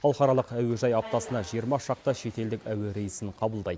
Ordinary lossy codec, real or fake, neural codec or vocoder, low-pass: none; real; none; none